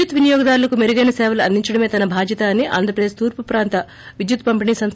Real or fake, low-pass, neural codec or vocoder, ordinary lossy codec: real; none; none; none